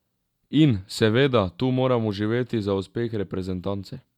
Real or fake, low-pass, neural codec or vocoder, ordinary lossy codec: real; 19.8 kHz; none; none